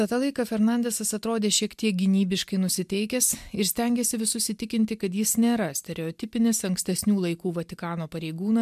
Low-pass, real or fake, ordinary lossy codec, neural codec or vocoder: 14.4 kHz; real; MP3, 96 kbps; none